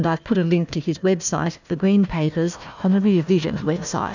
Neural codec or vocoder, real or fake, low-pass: codec, 16 kHz, 1 kbps, FunCodec, trained on Chinese and English, 50 frames a second; fake; 7.2 kHz